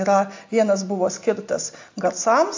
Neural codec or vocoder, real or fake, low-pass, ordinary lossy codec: none; real; 7.2 kHz; AAC, 48 kbps